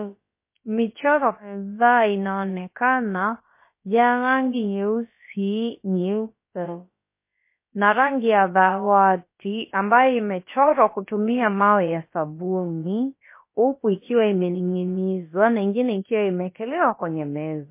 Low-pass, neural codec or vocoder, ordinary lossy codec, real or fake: 3.6 kHz; codec, 16 kHz, about 1 kbps, DyCAST, with the encoder's durations; MP3, 24 kbps; fake